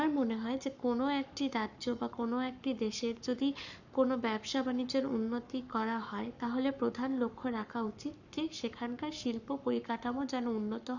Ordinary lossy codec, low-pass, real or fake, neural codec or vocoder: none; 7.2 kHz; fake; codec, 44.1 kHz, 7.8 kbps, Pupu-Codec